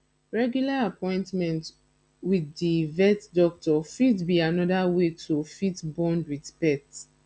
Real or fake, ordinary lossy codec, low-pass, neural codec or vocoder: real; none; none; none